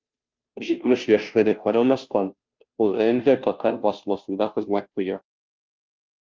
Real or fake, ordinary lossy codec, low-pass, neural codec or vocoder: fake; Opus, 24 kbps; 7.2 kHz; codec, 16 kHz, 0.5 kbps, FunCodec, trained on Chinese and English, 25 frames a second